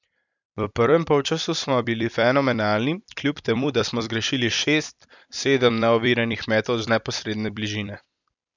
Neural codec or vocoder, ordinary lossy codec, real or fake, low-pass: vocoder, 44.1 kHz, 128 mel bands every 256 samples, BigVGAN v2; none; fake; 7.2 kHz